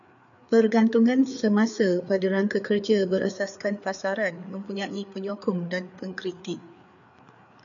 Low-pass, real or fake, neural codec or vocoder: 7.2 kHz; fake; codec, 16 kHz, 4 kbps, FreqCodec, larger model